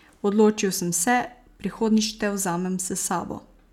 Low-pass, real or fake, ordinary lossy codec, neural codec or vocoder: 19.8 kHz; real; none; none